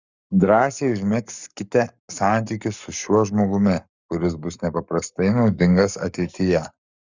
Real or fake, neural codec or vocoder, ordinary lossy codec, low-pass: fake; codec, 44.1 kHz, 7.8 kbps, Pupu-Codec; Opus, 64 kbps; 7.2 kHz